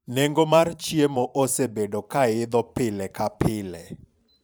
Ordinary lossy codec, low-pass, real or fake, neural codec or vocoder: none; none; fake; vocoder, 44.1 kHz, 128 mel bands every 512 samples, BigVGAN v2